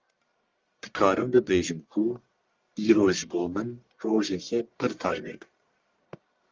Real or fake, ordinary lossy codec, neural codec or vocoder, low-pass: fake; Opus, 32 kbps; codec, 44.1 kHz, 1.7 kbps, Pupu-Codec; 7.2 kHz